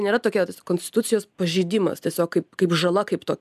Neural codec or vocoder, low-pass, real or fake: none; 14.4 kHz; real